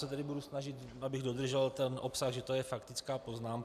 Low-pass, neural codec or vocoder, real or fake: 14.4 kHz; none; real